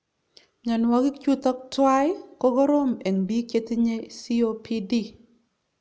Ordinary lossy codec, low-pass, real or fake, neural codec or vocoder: none; none; real; none